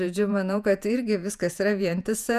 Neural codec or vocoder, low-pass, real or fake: vocoder, 48 kHz, 128 mel bands, Vocos; 14.4 kHz; fake